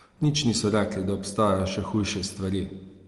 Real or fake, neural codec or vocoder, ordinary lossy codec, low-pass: real; none; Opus, 24 kbps; 10.8 kHz